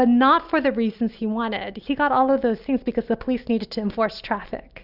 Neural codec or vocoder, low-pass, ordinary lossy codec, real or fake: none; 5.4 kHz; Opus, 64 kbps; real